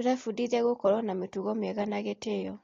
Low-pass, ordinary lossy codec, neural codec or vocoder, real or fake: 7.2 kHz; AAC, 32 kbps; none; real